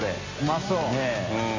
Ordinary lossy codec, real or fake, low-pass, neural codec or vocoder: MP3, 64 kbps; real; 7.2 kHz; none